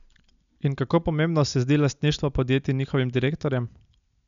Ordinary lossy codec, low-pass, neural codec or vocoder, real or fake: none; 7.2 kHz; none; real